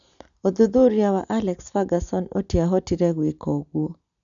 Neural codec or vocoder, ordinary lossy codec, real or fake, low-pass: none; none; real; 7.2 kHz